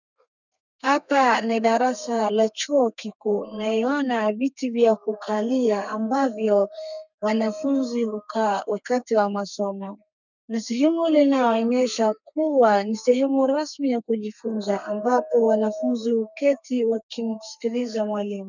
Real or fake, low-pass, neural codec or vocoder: fake; 7.2 kHz; codec, 32 kHz, 1.9 kbps, SNAC